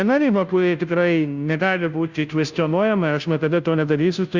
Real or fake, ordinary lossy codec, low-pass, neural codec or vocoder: fake; Opus, 64 kbps; 7.2 kHz; codec, 16 kHz, 0.5 kbps, FunCodec, trained on Chinese and English, 25 frames a second